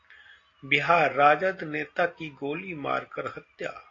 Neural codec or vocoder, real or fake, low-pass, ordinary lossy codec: none; real; 7.2 kHz; AAC, 32 kbps